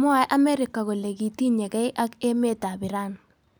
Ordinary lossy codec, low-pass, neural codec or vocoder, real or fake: none; none; none; real